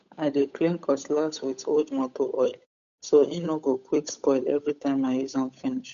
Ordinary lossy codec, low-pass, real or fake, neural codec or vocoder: none; 7.2 kHz; fake; codec, 16 kHz, 8 kbps, FunCodec, trained on Chinese and English, 25 frames a second